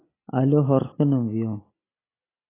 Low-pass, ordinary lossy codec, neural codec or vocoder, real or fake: 3.6 kHz; AAC, 16 kbps; none; real